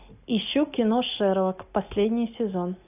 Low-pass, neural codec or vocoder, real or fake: 3.6 kHz; none; real